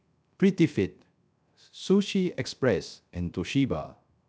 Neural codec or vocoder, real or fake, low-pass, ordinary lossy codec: codec, 16 kHz, 0.3 kbps, FocalCodec; fake; none; none